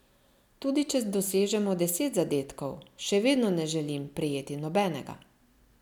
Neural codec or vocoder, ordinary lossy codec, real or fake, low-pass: none; none; real; 19.8 kHz